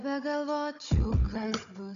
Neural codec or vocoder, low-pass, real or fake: codec, 16 kHz, 16 kbps, FunCodec, trained on Chinese and English, 50 frames a second; 7.2 kHz; fake